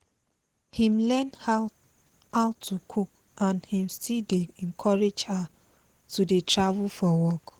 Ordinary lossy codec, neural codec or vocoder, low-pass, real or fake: Opus, 16 kbps; none; 19.8 kHz; real